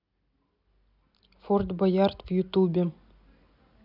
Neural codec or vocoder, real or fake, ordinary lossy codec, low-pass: none; real; none; 5.4 kHz